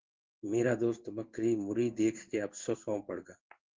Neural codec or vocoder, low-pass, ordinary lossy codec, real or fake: codec, 16 kHz in and 24 kHz out, 1 kbps, XY-Tokenizer; 7.2 kHz; Opus, 16 kbps; fake